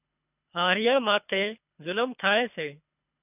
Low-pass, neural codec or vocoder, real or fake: 3.6 kHz; codec, 24 kHz, 3 kbps, HILCodec; fake